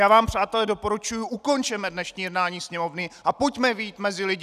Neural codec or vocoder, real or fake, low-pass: none; real; 14.4 kHz